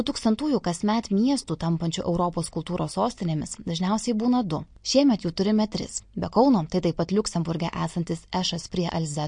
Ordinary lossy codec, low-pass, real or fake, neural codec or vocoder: MP3, 48 kbps; 9.9 kHz; real; none